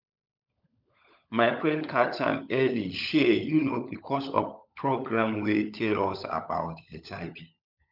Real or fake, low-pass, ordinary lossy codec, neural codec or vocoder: fake; 5.4 kHz; none; codec, 16 kHz, 16 kbps, FunCodec, trained on LibriTTS, 50 frames a second